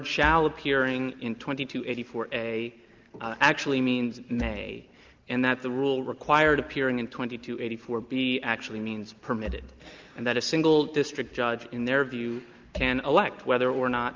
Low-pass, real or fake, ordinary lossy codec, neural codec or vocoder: 7.2 kHz; real; Opus, 32 kbps; none